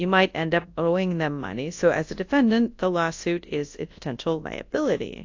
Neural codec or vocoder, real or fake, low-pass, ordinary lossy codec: codec, 24 kHz, 0.9 kbps, WavTokenizer, large speech release; fake; 7.2 kHz; AAC, 48 kbps